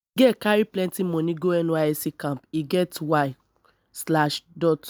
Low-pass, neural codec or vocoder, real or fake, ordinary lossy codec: none; none; real; none